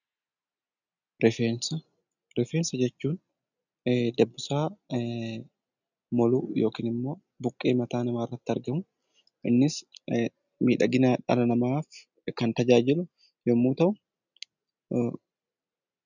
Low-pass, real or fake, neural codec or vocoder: 7.2 kHz; real; none